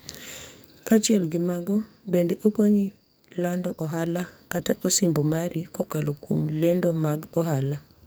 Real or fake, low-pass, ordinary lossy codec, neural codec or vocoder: fake; none; none; codec, 44.1 kHz, 2.6 kbps, SNAC